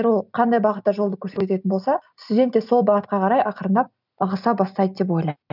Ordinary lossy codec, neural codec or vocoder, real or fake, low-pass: none; none; real; 5.4 kHz